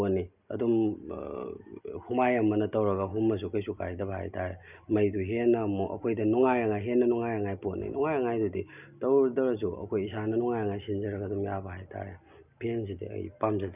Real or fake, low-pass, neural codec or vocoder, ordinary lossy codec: real; 3.6 kHz; none; none